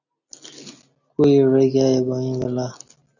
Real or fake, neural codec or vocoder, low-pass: real; none; 7.2 kHz